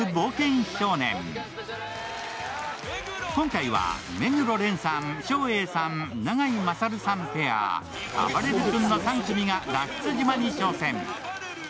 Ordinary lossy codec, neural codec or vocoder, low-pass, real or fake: none; none; none; real